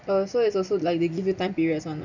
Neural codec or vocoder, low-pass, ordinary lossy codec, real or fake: none; 7.2 kHz; none; real